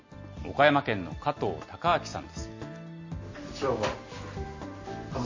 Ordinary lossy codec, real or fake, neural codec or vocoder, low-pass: MP3, 32 kbps; real; none; 7.2 kHz